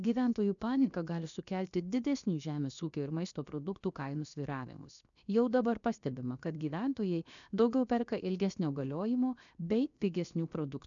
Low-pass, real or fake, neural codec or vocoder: 7.2 kHz; fake; codec, 16 kHz, 0.7 kbps, FocalCodec